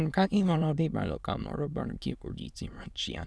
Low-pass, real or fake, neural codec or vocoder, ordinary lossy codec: none; fake; autoencoder, 22.05 kHz, a latent of 192 numbers a frame, VITS, trained on many speakers; none